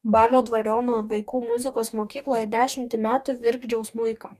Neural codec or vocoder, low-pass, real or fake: codec, 44.1 kHz, 2.6 kbps, DAC; 14.4 kHz; fake